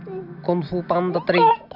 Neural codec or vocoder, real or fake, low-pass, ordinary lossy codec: none; real; 5.4 kHz; none